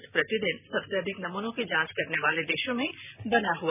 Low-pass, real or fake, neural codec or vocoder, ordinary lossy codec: 3.6 kHz; real; none; none